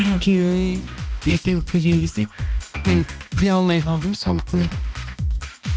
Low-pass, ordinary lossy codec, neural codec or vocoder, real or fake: none; none; codec, 16 kHz, 1 kbps, X-Codec, HuBERT features, trained on balanced general audio; fake